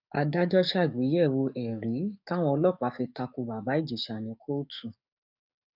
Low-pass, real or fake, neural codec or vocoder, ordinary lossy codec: 5.4 kHz; fake; codec, 44.1 kHz, 7.8 kbps, DAC; none